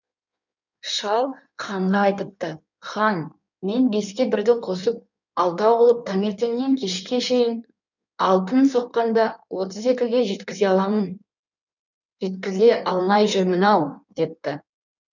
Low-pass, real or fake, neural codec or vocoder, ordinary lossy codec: 7.2 kHz; fake; codec, 16 kHz in and 24 kHz out, 1.1 kbps, FireRedTTS-2 codec; none